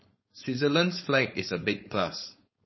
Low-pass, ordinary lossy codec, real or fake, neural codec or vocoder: 7.2 kHz; MP3, 24 kbps; fake; codec, 16 kHz, 4.8 kbps, FACodec